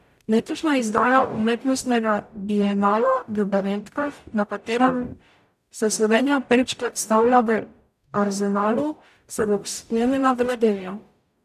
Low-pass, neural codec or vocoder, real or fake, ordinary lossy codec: 14.4 kHz; codec, 44.1 kHz, 0.9 kbps, DAC; fake; none